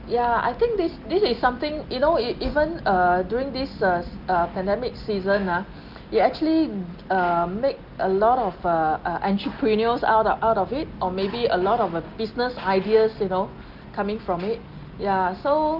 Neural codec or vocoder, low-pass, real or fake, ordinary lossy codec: none; 5.4 kHz; real; Opus, 32 kbps